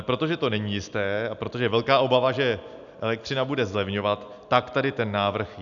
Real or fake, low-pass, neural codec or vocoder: real; 7.2 kHz; none